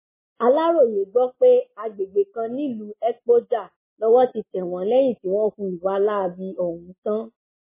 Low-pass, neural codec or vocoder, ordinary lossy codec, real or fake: 3.6 kHz; none; MP3, 16 kbps; real